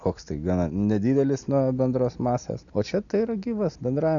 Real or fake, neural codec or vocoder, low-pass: real; none; 7.2 kHz